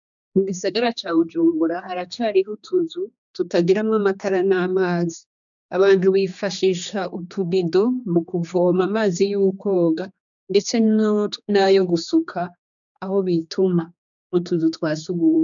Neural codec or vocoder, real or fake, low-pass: codec, 16 kHz, 2 kbps, X-Codec, HuBERT features, trained on general audio; fake; 7.2 kHz